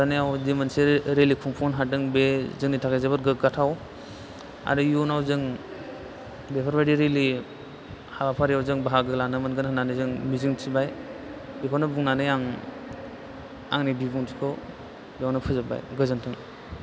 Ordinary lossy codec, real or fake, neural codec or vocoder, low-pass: none; real; none; none